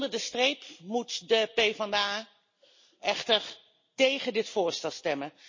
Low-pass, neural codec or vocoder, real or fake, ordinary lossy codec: 7.2 kHz; none; real; MP3, 32 kbps